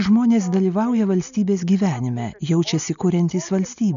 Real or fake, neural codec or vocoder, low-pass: real; none; 7.2 kHz